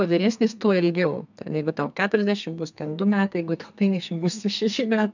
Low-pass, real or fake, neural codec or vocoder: 7.2 kHz; fake; codec, 32 kHz, 1.9 kbps, SNAC